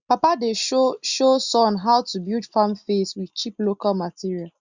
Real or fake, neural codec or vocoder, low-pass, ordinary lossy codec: real; none; 7.2 kHz; Opus, 64 kbps